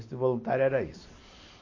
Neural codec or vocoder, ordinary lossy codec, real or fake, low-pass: none; MP3, 32 kbps; real; 7.2 kHz